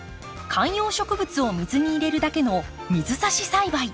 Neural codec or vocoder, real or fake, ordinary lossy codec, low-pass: none; real; none; none